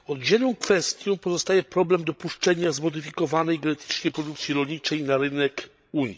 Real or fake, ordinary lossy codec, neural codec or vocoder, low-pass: fake; none; codec, 16 kHz, 16 kbps, FreqCodec, larger model; none